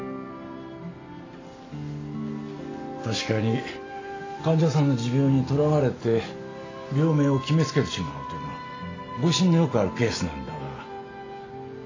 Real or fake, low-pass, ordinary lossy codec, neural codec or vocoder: real; 7.2 kHz; AAC, 32 kbps; none